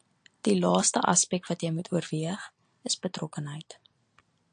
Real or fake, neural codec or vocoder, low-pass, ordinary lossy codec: real; none; 9.9 kHz; AAC, 64 kbps